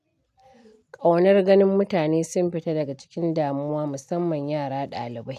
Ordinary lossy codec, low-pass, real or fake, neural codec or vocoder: none; 14.4 kHz; real; none